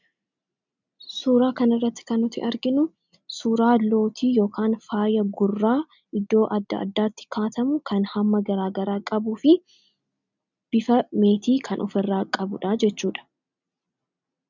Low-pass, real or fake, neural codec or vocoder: 7.2 kHz; real; none